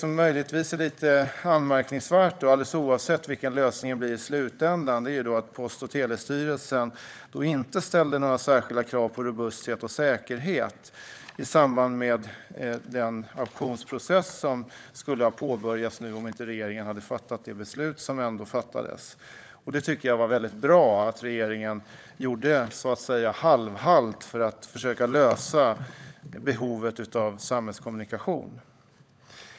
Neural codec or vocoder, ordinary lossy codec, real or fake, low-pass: codec, 16 kHz, 16 kbps, FunCodec, trained on LibriTTS, 50 frames a second; none; fake; none